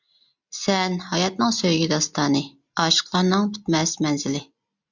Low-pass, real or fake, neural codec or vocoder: 7.2 kHz; real; none